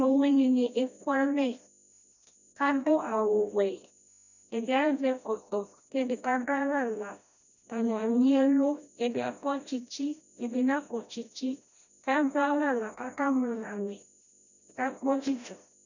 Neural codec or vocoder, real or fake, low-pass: codec, 16 kHz, 1 kbps, FreqCodec, smaller model; fake; 7.2 kHz